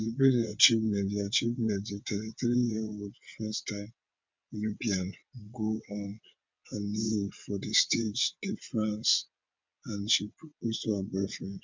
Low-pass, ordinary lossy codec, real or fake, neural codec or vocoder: 7.2 kHz; MP3, 64 kbps; fake; vocoder, 22.05 kHz, 80 mel bands, WaveNeXt